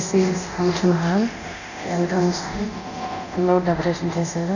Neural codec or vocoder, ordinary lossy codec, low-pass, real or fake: codec, 24 kHz, 0.9 kbps, DualCodec; none; 7.2 kHz; fake